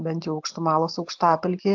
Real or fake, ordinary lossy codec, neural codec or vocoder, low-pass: real; AAC, 48 kbps; none; 7.2 kHz